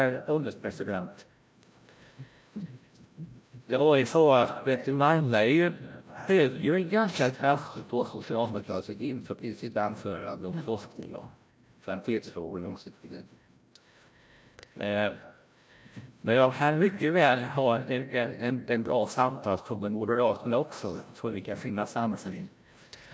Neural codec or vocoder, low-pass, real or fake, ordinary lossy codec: codec, 16 kHz, 0.5 kbps, FreqCodec, larger model; none; fake; none